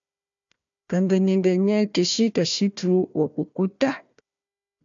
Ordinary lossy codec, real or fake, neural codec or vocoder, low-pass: AAC, 64 kbps; fake; codec, 16 kHz, 1 kbps, FunCodec, trained on Chinese and English, 50 frames a second; 7.2 kHz